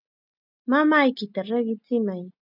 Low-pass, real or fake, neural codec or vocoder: 5.4 kHz; real; none